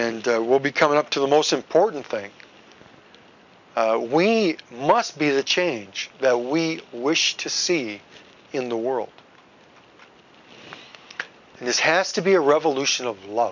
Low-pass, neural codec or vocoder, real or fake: 7.2 kHz; none; real